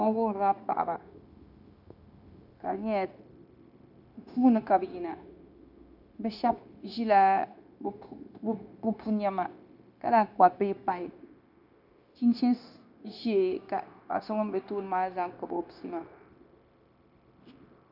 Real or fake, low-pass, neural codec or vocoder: fake; 5.4 kHz; codec, 16 kHz, 0.9 kbps, LongCat-Audio-Codec